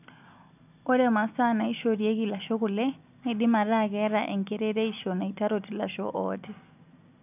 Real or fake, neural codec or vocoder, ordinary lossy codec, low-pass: real; none; none; 3.6 kHz